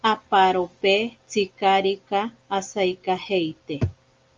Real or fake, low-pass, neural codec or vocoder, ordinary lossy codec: real; 7.2 kHz; none; Opus, 32 kbps